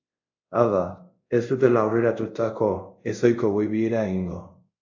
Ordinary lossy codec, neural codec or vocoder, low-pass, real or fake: AAC, 48 kbps; codec, 24 kHz, 0.5 kbps, DualCodec; 7.2 kHz; fake